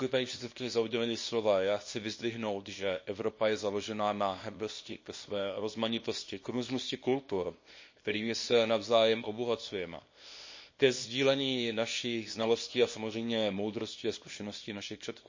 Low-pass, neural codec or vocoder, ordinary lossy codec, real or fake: 7.2 kHz; codec, 24 kHz, 0.9 kbps, WavTokenizer, small release; MP3, 32 kbps; fake